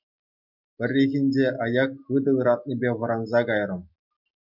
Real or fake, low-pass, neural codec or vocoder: real; 5.4 kHz; none